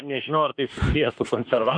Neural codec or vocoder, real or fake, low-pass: autoencoder, 48 kHz, 32 numbers a frame, DAC-VAE, trained on Japanese speech; fake; 9.9 kHz